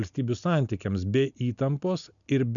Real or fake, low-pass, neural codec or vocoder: real; 7.2 kHz; none